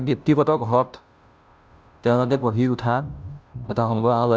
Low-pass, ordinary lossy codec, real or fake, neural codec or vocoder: none; none; fake; codec, 16 kHz, 0.5 kbps, FunCodec, trained on Chinese and English, 25 frames a second